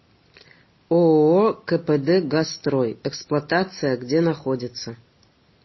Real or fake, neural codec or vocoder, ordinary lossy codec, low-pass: real; none; MP3, 24 kbps; 7.2 kHz